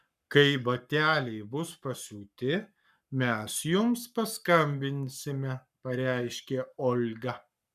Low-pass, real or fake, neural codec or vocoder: 14.4 kHz; fake; codec, 44.1 kHz, 7.8 kbps, Pupu-Codec